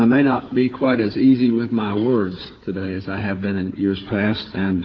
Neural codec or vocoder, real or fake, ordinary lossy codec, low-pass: codec, 16 kHz, 4 kbps, FunCodec, trained on Chinese and English, 50 frames a second; fake; AAC, 32 kbps; 7.2 kHz